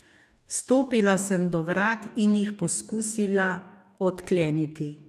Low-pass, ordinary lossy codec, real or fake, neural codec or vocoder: 14.4 kHz; none; fake; codec, 44.1 kHz, 2.6 kbps, DAC